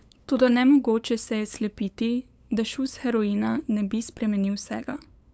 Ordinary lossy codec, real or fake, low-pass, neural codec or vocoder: none; fake; none; codec, 16 kHz, 8 kbps, FunCodec, trained on LibriTTS, 25 frames a second